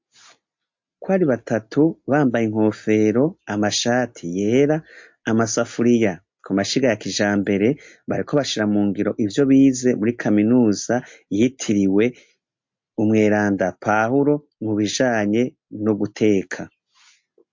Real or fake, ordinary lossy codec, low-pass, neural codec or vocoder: real; MP3, 48 kbps; 7.2 kHz; none